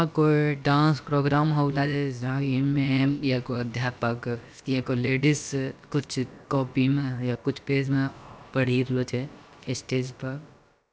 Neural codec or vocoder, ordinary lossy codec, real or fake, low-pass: codec, 16 kHz, about 1 kbps, DyCAST, with the encoder's durations; none; fake; none